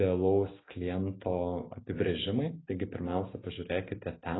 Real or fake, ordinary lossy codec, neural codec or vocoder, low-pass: real; AAC, 16 kbps; none; 7.2 kHz